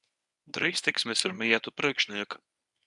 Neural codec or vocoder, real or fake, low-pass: codec, 24 kHz, 0.9 kbps, WavTokenizer, medium speech release version 1; fake; 10.8 kHz